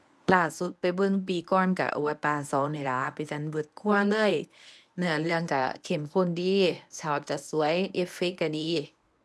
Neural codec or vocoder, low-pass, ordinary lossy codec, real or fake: codec, 24 kHz, 0.9 kbps, WavTokenizer, medium speech release version 1; none; none; fake